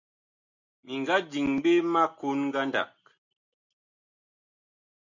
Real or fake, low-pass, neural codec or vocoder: real; 7.2 kHz; none